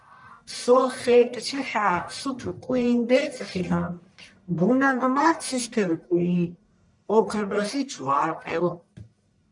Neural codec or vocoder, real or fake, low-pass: codec, 44.1 kHz, 1.7 kbps, Pupu-Codec; fake; 10.8 kHz